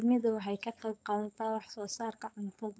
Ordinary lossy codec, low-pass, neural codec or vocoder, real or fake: none; none; codec, 16 kHz, 4.8 kbps, FACodec; fake